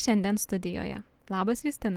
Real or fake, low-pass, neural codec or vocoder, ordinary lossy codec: real; 19.8 kHz; none; Opus, 16 kbps